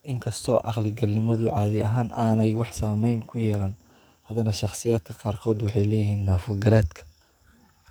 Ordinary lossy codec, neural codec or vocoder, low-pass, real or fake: none; codec, 44.1 kHz, 2.6 kbps, SNAC; none; fake